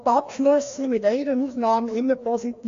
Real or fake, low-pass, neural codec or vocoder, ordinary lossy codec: fake; 7.2 kHz; codec, 16 kHz, 1 kbps, FreqCodec, larger model; AAC, 48 kbps